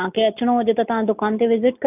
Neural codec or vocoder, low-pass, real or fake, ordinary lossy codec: none; 3.6 kHz; real; none